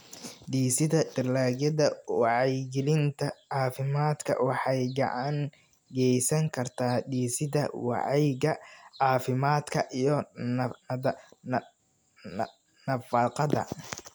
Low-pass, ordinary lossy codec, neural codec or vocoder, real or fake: none; none; none; real